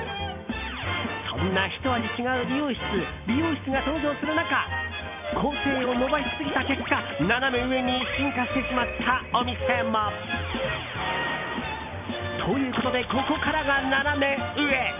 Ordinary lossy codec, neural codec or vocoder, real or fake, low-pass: none; none; real; 3.6 kHz